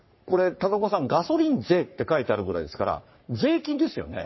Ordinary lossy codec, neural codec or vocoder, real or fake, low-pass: MP3, 24 kbps; codec, 44.1 kHz, 3.4 kbps, Pupu-Codec; fake; 7.2 kHz